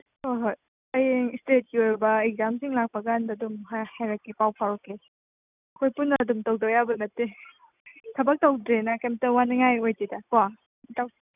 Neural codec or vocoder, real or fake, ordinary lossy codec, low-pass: none; real; none; 3.6 kHz